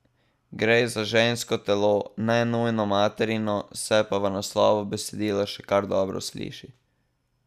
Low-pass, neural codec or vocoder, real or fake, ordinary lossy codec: 14.4 kHz; none; real; none